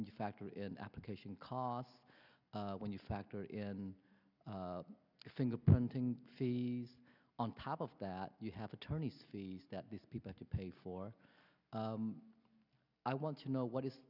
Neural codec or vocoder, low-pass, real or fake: none; 5.4 kHz; real